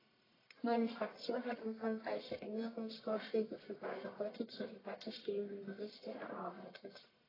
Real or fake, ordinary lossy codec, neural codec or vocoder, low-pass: fake; AAC, 24 kbps; codec, 44.1 kHz, 1.7 kbps, Pupu-Codec; 5.4 kHz